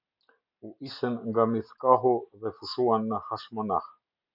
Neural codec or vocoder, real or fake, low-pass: none; real; 5.4 kHz